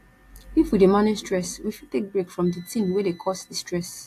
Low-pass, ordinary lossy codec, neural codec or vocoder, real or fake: 14.4 kHz; AAC, 64 kbps; none; real